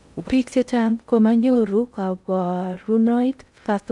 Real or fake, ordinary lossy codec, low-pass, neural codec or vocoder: fake; none; 10.8 kHz; codec, 16 kHz in and 24 kHz out, 0.6 kbps, FocalCodec, streaming, 2048 codes